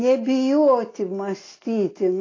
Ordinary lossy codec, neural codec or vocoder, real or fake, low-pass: AAC, 32 kbps; none; real; 7.2 kHz